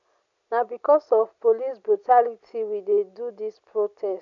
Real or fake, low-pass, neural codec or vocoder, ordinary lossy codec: real; 7.2 kHz; none; none